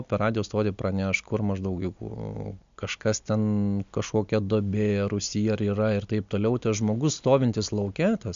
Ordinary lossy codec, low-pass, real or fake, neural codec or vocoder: MP3, 64 kbps; 7.2 kHz; real; none